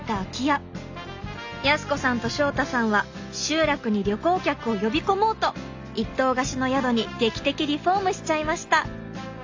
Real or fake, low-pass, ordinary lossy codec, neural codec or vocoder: real; 7.2 kHz; AAC, 48 kbps; none